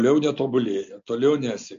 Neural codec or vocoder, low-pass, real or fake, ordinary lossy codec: none; 7.2 kHz; real; MP3, 48 kbps